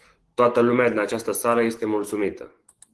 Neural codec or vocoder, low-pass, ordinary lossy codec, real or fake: autoencoder, 48 kHz, 128 numbers a frame, DAC-VAE, trained on Japanese speech; 10.8 kHz; Opus, 16 kbps; fake